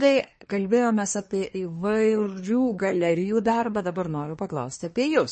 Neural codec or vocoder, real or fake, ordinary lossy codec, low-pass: codec, 24 kHz, 1 kbps, SNAC; fake; MP3, 32 kbps; 10.8 kHz